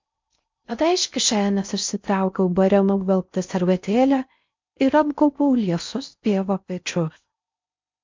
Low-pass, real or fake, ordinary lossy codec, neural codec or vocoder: 7.2 kHz; fake; MP3, 64 kbps; codec, 16 kHz in and 24 kHz out, 0.6 kbps, FocalCodec, streaming, 4096 codes